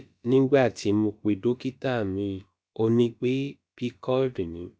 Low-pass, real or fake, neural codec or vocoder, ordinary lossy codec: none; fake; codec, 16 kHz, about 1 kbps, DyCAST, with the encoder's durations; none